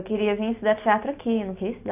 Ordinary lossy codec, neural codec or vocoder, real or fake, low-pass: AAC, 24 kbps; none; real; 3.6 kHz